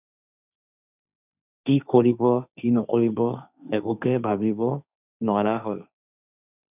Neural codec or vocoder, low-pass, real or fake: codec, 16 kHz, 1.1 kbps, Voila-Tokenizer; 3.6 kHz; fake